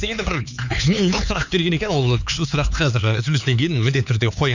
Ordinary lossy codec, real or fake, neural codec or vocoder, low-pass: none; fake; codec, 16 kHz, 4 kbps, X-Codec, HuBERT features, trained on LibriSpeech; 7.2 kHz